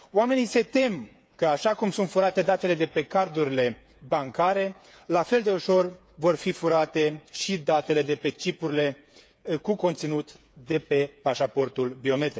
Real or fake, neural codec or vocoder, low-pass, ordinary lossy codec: fake; codec, 16 kHz, 8 kbps, FreqCodec, smaller model; none; none